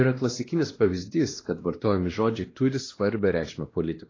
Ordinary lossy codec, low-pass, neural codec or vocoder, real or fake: AAC, 32 kbps; 7.2 kHz; codec, 16 kHz, 1 kbps, X-Codec, WavLM features, trained on Multilingual LibriSpeech; fake